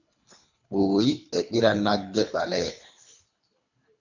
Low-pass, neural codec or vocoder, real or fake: 7.2 kHz; codec, 24 kHz, 3 kbps, HILCodec; fake